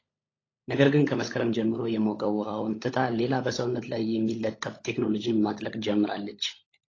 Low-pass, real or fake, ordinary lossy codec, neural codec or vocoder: 7.2 kHz; fake; AAC, 32 kbps; codec, 16 kHz, 16 kbps, FunCodec, trained on LibriTTS, 50 frames a second